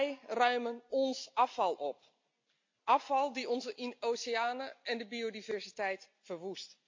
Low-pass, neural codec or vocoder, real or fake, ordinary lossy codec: 7.2 kHz; none; real; MP3, 48 kbps